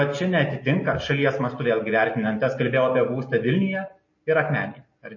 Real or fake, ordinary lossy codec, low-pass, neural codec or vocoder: real; MP3, 32 kbps; 7.2 kHz; none